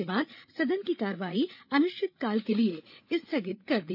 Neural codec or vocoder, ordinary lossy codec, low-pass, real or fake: codec, 16 kHz, 8 kbps, FreqCodec, larger model; none; 5.4 kHz; fake